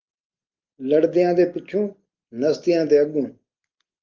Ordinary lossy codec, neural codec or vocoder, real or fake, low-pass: Opus, 32 kbps; none; real; 7.2 kHz